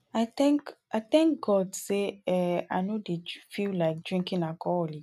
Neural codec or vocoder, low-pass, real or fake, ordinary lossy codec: none; 14.4 kHz; real; none